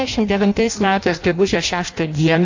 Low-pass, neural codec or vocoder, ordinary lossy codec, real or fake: 7.2 kHz; codec, 16 kHz in and 24 kHz out, 0.6 kbps, FireRedTTS-2 codec; AAC, 48 kbps; fake